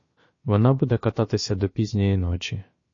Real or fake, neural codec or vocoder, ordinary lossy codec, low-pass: fake; codec, 16 kHz, about 1 kbps, DyCAST, with the encoder's durations; MP3, 32 kbps; 7.2 kHz